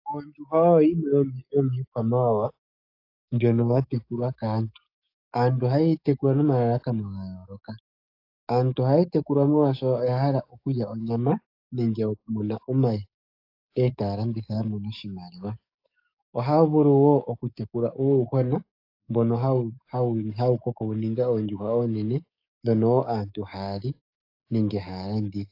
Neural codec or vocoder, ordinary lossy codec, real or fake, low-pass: codec, 44.1 kHz, 7.8 kbps, DAC; AAC, 32 kbps; fake; 5.4 kHz